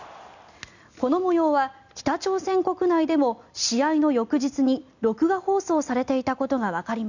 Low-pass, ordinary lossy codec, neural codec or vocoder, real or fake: 7.2 kHz; none; none; real